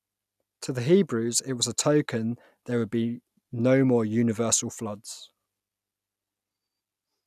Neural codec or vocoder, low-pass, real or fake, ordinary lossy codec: none; 14.4 kHz; real; none